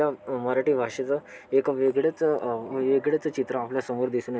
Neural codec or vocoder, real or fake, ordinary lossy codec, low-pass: none; real; none; none